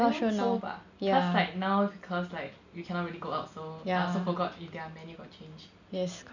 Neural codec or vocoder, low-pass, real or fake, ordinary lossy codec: none; 7.2 kHz; real; none